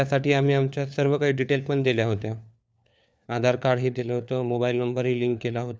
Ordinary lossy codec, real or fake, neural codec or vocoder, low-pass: none; fake; codec, 16 kHz, 4 kbps, FunCodec, trained on LibriTTS, 50 frames a second; none